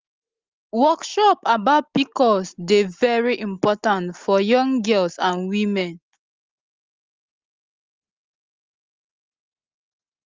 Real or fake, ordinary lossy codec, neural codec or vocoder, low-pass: real; Opus, 32 kbps; none; 7.2 kHz